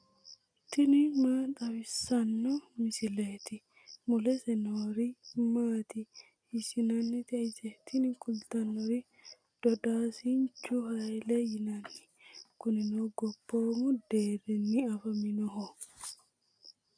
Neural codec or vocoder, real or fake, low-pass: none; real; 9.9 kHz